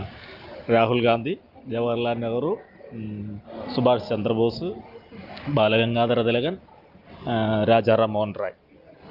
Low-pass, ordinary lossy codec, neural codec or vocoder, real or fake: 5.4 kHz; Opus, 24 kbps; none; real